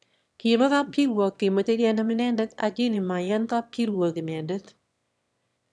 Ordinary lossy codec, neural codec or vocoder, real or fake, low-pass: none; autoencoder, 22.05 kHz, a latent of 192 numbers a frame, VITS, trained on one speaker; fake; none